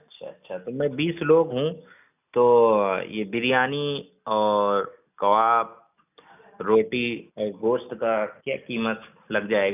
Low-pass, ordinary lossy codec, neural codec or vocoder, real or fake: 3.6 kHz; none; none; real